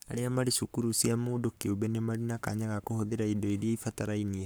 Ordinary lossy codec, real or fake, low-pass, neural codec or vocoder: none; fake; none; codec, 44.1 kHz, 7.8 kbps, Pupu-Codec